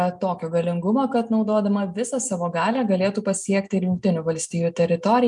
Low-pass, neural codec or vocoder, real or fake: 10.8 kHz; none; real